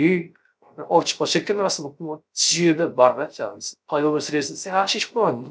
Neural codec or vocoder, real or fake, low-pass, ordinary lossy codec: codec, 16 kHz, 0.3 kbps, FocalCodec; fake; none; none